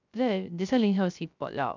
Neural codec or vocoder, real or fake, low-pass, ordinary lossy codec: codec, 16 kHz, 0.3 kbps, FocalCodec; fake; 7.2 kHz; MP3, 64 kbps